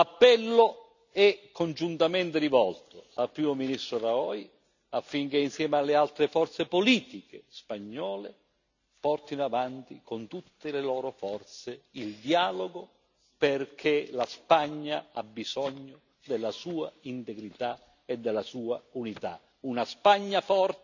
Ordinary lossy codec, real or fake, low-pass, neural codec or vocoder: none; real; 7.2 kHz; none